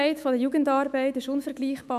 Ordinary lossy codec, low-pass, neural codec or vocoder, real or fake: none; 14.4 kHz; autoencoder, 48 kHz, 128 numbers a frame, DAC-VAE, trained on Japanese speech; fake